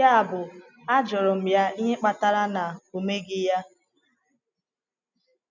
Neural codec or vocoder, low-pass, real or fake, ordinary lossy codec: none; 7.2 kHz; real; none